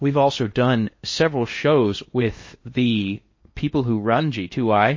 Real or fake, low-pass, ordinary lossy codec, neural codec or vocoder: fake; 7.2 kHz; MP3, 32 kbps; codec, 16 kHz in and 24 kHz out, 0.8 kbps, FocalCodec, streaming, 65536 codes